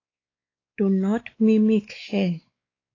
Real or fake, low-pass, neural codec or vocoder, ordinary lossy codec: fake; 7.2 kHz; codec, 16 kHz, 4 kbps, X-Codec, WavLM features, trained on Multilingual LibriSpeech; AAC, 32 kbps